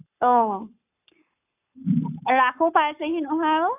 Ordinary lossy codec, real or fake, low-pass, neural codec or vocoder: none; fake; 3.6 kHz; codec, 44.1 kHz, 7.8 kbps, Pupu-Codec